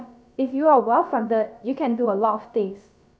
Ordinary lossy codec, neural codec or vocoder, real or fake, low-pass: none; codec, 16 kHz, about 1 kbps, DyCAST, with the encoder's durations; fake; none